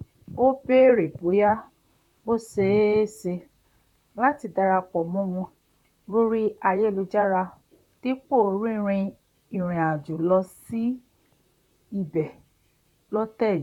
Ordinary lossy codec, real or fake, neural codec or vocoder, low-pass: none; fake; vocoder, 44.1 kHz, 128 mel bands, Pupu-Vocoder; 19.8 kHz